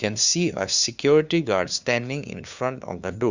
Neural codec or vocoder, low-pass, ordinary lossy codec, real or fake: codec, 16 kHz, 2 kbps, FunCodec, trained on LibriTTS, 25 frames a second; 7.2 kHz; Opus, 64 kbps; fake